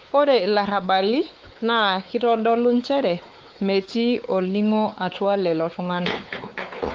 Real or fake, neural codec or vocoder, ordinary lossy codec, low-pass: fake; codec, 16 kHz, 4 kbps, X-Codec, WavLM features, trained on Multilingual LibriSpeech; Opus, 32 kbps; 7.2 kHz